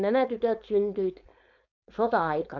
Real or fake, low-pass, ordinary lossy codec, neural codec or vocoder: fake; 7.2 kHz; none; codec, 16 kHz, 4.8 kbps, FACodec